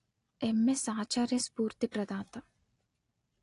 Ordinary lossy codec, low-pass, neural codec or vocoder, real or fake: AAC, 48 kbps; 10.8 kHz; none; real